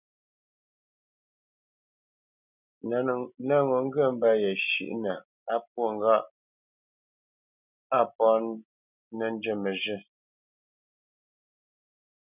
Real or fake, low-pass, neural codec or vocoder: real; 3.6 kHz; none